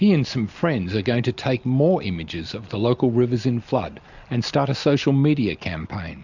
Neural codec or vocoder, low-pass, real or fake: none; 7.2 kHz; real